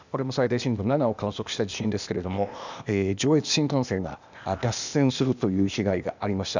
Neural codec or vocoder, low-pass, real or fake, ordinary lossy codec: codec, 16 kHz, 0.8 kbps, ZipCodec; 7.2 kHz; fake; none